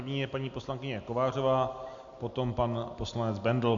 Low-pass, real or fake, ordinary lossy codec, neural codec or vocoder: 7.2 kHz; real; AAC, 64 kbps; none